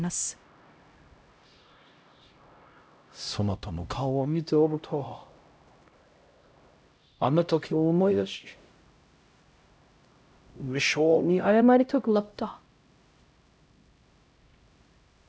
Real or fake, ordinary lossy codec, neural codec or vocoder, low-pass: fake; none; codec, 16 kHz, 0.5 kbps, X-Codec, HuBERT features, trained on LibriSpeech; none